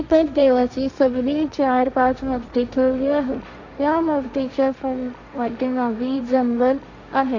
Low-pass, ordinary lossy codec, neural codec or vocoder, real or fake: 7.2 kHz; none; codec, 16 kHz, 1.1 kbps, Voila-Tokenizer; fake